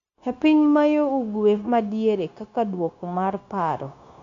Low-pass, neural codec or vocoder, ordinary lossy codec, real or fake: 7.2 kHz; codec, 16 kHz, 0.9 kbps, LongCat-Audio-Codec; AAC, 48 kbps; fake